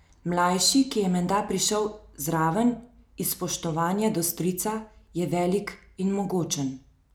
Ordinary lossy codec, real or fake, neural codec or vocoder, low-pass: none; real; none; none